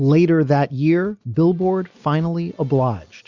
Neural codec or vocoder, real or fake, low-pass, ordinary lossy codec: none; real; 7.2 kHz; Opus, 64 kbps